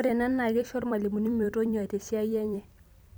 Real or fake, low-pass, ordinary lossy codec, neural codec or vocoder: fake; none; none; vocoder, 44.1 kHz, 128 mel bands every 256 samples, BigVGAN v2